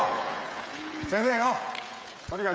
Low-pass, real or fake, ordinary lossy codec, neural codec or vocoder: none; fake; none; codec, 16 kHz, 16 kbps, FreqCodec, smaller model